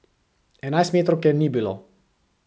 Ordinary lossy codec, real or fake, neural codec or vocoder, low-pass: none; real; none; none